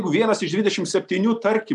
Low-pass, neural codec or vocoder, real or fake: 10.8 kHz; none; real